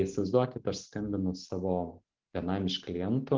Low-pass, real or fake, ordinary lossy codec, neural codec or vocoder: 7.2 kHz; real; Opus, 16 kbps; none